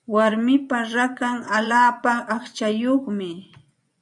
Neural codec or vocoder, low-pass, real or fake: vocoder, 24 kHz, 100 mel bands, Vocos; 10.8 kHz; fake